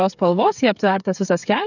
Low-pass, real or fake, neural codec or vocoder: 7.2 kHz; fake; codec, 16 kHz, 8 kbps, FreqCodec, smaller model